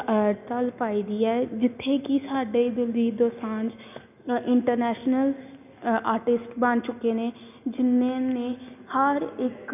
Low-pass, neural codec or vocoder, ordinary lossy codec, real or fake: 3.6 kHz; none; none; real